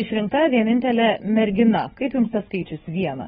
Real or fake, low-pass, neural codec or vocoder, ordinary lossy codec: fake; 7.2 kHz; codec, 16 kHz, 4 kbps, FunCodec, trained on LibriTTS, 50 frames a second; AAC, 16 kbps